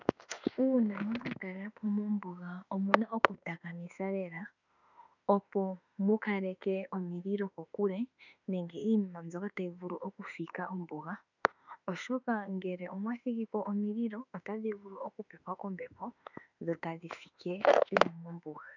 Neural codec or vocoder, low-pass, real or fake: autoencoder, 48 kHz, 32 numbers a frame, DAC-VAE, trained on Japanese speech; 7.2 kHz; fake